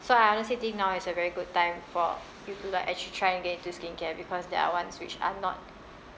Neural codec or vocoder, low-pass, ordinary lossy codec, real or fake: none; none; none; real